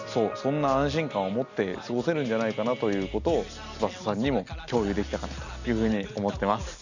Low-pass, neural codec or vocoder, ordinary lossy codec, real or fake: 7.2 kHz; none; none; real